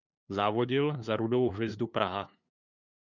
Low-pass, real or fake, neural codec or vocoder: 7.2 kHz; fake; codec, 16 kHz, 2 kbps, FunCodec, trained on LibriTTS, 25 frames a second